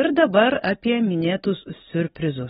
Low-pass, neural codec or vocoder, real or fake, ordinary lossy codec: 10.8 kHz; none; real; AAC, 16 kbps